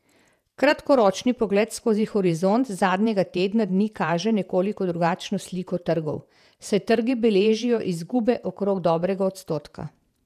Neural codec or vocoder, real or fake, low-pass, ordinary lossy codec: vocoder, 48 kHz, 128 mel bands, Vocos; fake; 14.4 kHz; none